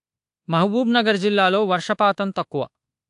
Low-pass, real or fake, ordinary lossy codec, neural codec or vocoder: 10.8 kHz; fake; AAC, 64 kbps; codec, 24 kHz, 1.2 kbps, DualCodec